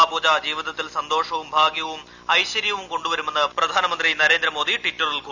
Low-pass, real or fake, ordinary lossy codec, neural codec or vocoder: 7.2 kHz; real; none; none